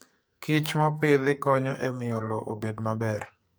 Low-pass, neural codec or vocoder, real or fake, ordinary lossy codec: none; codec, 44.1 kHz, 2.6 kbps, SNAC; fake; none